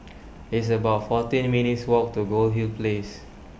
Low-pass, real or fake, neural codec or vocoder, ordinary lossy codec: none; real; none; none